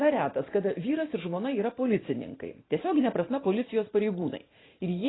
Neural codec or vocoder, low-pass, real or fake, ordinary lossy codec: vocoder, 44.1 kHz, 128 mel bands every 512 samples, BigVGAN v2; 7.2 kHz; fake; AAC, 16 kbps